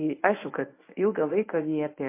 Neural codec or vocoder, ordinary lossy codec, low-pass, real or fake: codec, 24 kHz, 0.9 kbps, WavTokenizer, medium speech release version 1; MP3, 24 kbps; 3.6 kHz; fake